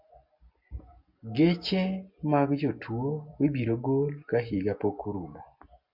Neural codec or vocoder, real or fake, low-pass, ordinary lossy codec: none; real; 5.4 kHz; MP3, 48 kbps